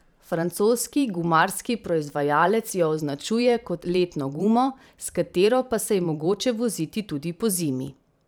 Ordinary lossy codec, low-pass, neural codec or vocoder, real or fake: none; none; vocoder, 44.1 kHz, 128 mel bands every 512 samples, BigVGAN v2; fake